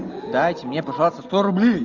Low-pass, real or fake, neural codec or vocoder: 7.2 kHz; real; none